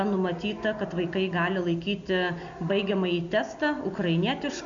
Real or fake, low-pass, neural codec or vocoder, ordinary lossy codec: real; 7.2 kHz; none; AAC, 64 kbps